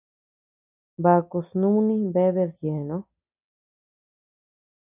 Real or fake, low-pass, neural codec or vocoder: real; 3.6 kHz; none